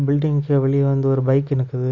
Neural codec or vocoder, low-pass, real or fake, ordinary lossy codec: none; 7.2 kHz; real; none